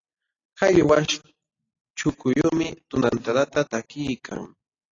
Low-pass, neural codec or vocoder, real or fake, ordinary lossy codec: 7.2 kHz; none; real; AAC, 32 kbps